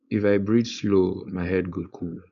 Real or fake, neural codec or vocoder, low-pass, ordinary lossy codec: fake; codec, 16 kHz, 4.8 kbps, FACodec; 7.2 kHz; AAC, 96 kbps